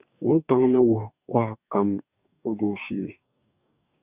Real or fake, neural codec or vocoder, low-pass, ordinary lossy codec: fake; codec, 44.1 kHz, 2.6 kbps, DAC; 3.6 kHz; Opus, 64 kbps